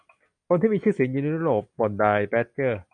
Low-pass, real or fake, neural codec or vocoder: 9.9 kHz; real; none